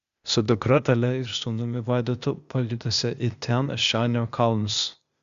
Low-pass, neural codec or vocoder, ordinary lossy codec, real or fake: 7.2 kHz; codec, 16 kHz, 0.8 kbps, ZipCodec; Opus, 64 kbps; fake